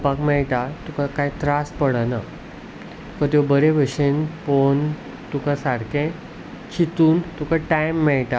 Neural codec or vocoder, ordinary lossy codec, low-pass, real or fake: none; none; none; real